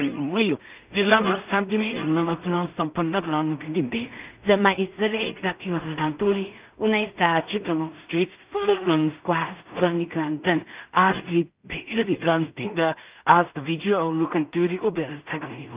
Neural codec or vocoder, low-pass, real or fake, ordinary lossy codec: codec, 16 kHz in and 24 kHz out, 0.4 kbps, LongCat-Audio-Codec, two codebook decoder; 3.6 kHz; fake; Opus, 24 kbps